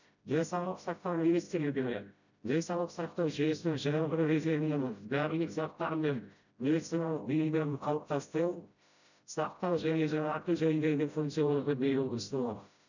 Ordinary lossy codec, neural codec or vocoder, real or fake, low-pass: none; codec, 16 kHz, 0.5 kbps, FreqCodec, smaller model; fake; 7.2 kHz